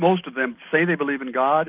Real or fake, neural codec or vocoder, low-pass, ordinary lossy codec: real; none; 3.6 kHz; Opus, 24 kbps